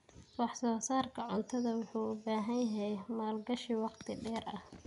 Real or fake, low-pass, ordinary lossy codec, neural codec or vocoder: real; 10.8 kHz; none; none